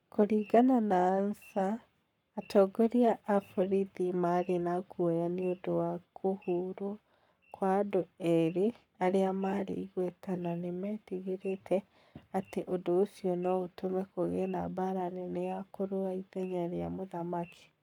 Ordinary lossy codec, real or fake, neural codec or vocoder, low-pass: none; fake; codec, 44.1 kHz, 7.8 kbps, Pupu-Codec; 19.8 kHz